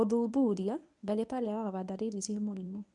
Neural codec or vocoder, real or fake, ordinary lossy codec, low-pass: codec, 24 kHz, 0.9 kbps, WavTokenizer, medium speech release version 1; fake; none; 10.8 kHz